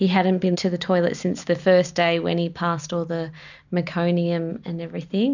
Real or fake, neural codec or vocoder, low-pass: real; none; 7.2 kHz